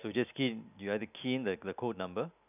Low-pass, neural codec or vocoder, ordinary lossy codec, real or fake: 3.6 kHz; none; none; real